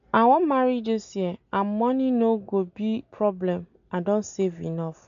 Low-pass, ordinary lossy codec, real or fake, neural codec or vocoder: 7.2 kHz; none; real; none